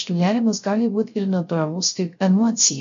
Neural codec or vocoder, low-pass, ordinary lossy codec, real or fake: codec, 16 kHz, 0.3 kbps, FocalCodec; 7.2 kHz; MP3, 48 kbps; fake